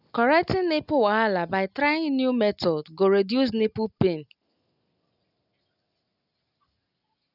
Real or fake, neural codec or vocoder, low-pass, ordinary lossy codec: real; none; 5.4 kHz; none